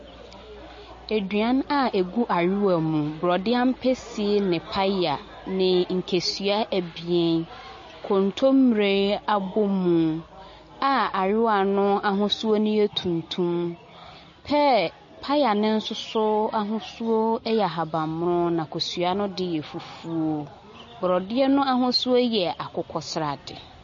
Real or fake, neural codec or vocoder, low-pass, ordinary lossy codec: real; none; 7.2 kHz; MP3, 32 kbps